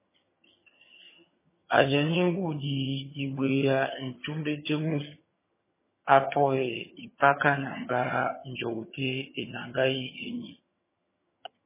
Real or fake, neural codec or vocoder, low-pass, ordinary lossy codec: fake; vocoder, 22.05 kHz, 80 mel bands, HiFi-GAN; 3.6 kHz; MP3, 16 kbps